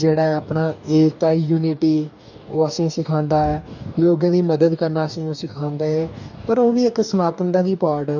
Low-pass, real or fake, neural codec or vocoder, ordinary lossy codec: 7.2 kHz; fake; codec, 44.1 kHz, 2.6 kbps, DAC; none